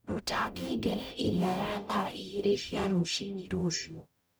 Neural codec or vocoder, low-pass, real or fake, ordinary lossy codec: codec, 44.1 kHz, 0.9 kbps, DAC; none; fake; none